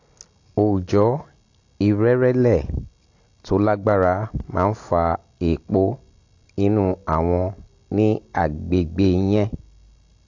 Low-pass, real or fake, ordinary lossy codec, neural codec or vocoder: 7.2 kHz; real; AAC, 48 kbps; none